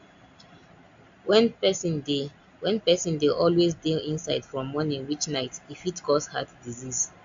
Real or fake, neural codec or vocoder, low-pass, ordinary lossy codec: real; none; 7.2 kHz; AAC, 64 kbps